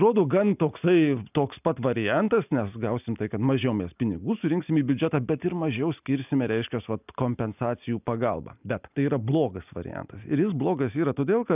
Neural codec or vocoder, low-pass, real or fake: none; 3.6 kHz; real